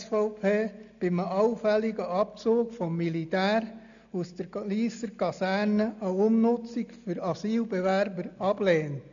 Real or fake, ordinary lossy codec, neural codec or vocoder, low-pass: real; none; none; 7.2 kHz